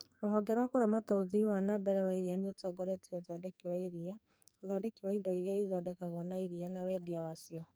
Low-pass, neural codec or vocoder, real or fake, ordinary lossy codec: none; codec, 44.1 kHz, 2.6 kbps, SNAC; fake; none